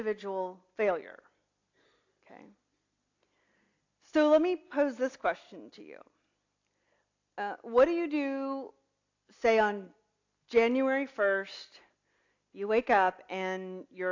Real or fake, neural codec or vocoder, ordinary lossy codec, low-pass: real; none; AAC, 48 kbps; 7.2 kHz